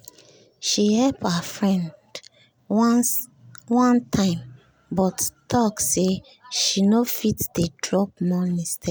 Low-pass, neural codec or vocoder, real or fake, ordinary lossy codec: none; none; real; none